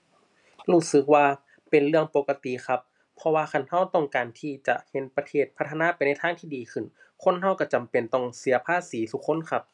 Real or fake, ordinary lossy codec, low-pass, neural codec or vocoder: real; none; 10.8 kHz; none